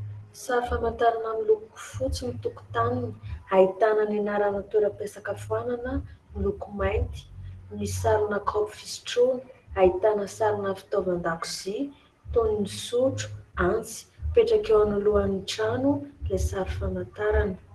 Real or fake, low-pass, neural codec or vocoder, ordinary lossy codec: real; 10.8 kHz; none; Opus, 16 kbps